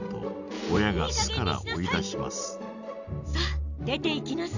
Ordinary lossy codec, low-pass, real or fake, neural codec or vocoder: none; 7.2 kHz; real; none